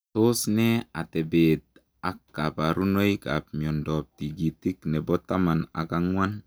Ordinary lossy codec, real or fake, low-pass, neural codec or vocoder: none; real; none; none